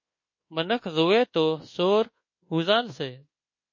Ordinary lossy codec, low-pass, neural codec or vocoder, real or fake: MP3, 32 kbps; 7.2 kHz; codec, 24 kHz, 1.2 kbps, DualCodec; fake